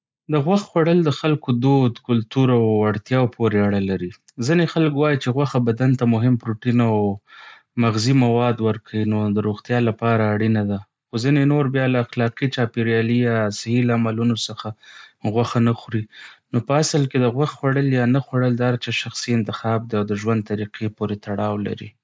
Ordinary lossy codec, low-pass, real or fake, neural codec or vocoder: none; none; real; none